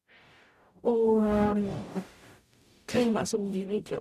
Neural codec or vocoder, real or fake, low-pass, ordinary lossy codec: codec, 44.1 kHz, 0.9 kbps, DAC; fake; 14.4 kHz; none